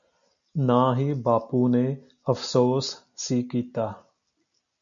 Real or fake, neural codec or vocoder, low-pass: real; none; 7.2 kHz